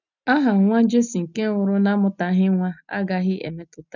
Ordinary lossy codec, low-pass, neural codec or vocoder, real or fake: none; 7.2 kHz; none; real